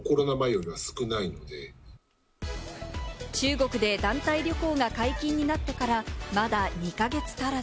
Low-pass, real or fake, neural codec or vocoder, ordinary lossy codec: none; real; none; none